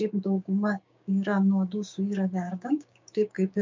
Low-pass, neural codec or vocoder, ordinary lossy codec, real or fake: 7.2 kHz; codec, 16 kHz, 6 kbps, DAC; MP3, 48 kbps; fake